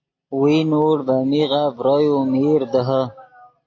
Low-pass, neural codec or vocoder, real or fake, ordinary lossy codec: 7.2 kHz; none; real; AAC, 32 kbps